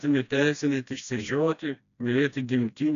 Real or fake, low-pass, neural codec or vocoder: fake; 7.2 kHz; codec, 16 kHz, 1 kbps, FreqCodec, smaller model